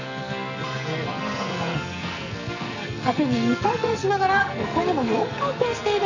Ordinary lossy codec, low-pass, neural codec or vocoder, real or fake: none; 7.2 kHz; codec, 44.1 kHz, 2.6 kbps, SNAC; fake